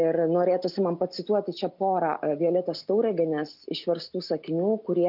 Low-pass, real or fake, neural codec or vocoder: 5.4 kHz; real; none